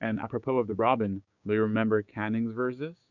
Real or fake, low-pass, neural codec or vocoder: fake; 7.2 kHz; codec, 16 kHz, 6 kbps, DAC